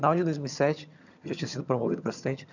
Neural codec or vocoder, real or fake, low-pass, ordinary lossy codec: vocoder, 22.05 kHz, 80 mel bands, HiFi-GAN; fake; 7.2 kHz; none